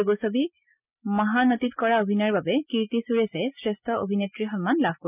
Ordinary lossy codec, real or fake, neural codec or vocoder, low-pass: none; real; none; 3.6 kHz